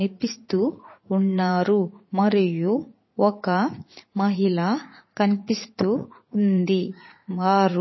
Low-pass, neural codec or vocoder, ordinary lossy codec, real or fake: 7.2 kHz; vocoder, 44.1 kHz, 80 mel bands, Vocos; MP3, 24 kbps; fake